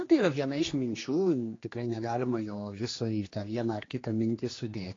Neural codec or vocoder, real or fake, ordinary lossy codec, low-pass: codec, 16 kHz, 2 kbps, X-Codec, HuBERT features, trained on general audio; fake; AAC, 32 kbps; 7.2 kHz